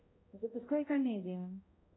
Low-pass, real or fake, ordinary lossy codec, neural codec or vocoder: 7.2 kHz; fake; AAC, 16 kbps; codec, 16 kHz, 0.5 kbps, X-Codec, HuBERT features, trained on balanced general audio